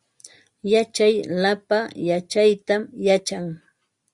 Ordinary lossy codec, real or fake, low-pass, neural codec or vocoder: Opus, 64 kbps; real; 10.8 kHz; none